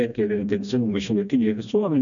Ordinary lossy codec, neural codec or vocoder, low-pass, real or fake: MP3, 96 kbps; codec, 16 kHz, 1 kbps, FreqCodec, smaller model; 7.2 kHz; fake